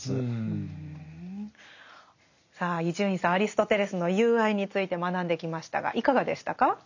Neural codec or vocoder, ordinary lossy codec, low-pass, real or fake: none; none; 7.2 kHz; real